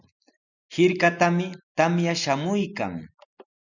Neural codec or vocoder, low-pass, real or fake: none; 7.2 kHz; real